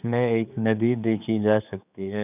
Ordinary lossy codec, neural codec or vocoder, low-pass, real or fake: none; codec, 16 kHz, 4 kbps, X-Codec, HuBERT features, trained on general audio; 3.6 kHz; fake